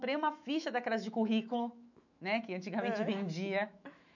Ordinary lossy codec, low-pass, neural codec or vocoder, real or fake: none; 7.2 kHz; autoencoder, 48 kHz, 128 numbers a frame, DAC-VAE, trained on Japanese speech; fake